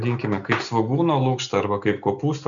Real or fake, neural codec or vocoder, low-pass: real; none; 7.2 kHz